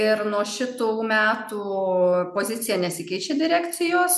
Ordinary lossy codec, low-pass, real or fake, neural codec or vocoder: MP3, 96 kbps; 14.4 kHz; real; none